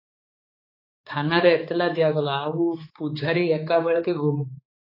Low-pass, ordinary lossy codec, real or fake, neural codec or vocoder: 5.4 kHz; AAC, 24 kbps; fake; codec, 16 kHz, 4 kbps, X-Codec, HuBERT features, trained on balanced general audio